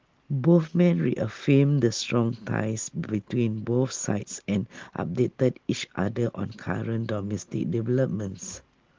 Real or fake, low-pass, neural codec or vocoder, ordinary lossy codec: real; 7.2 kHz; none; Opus, 16 kbps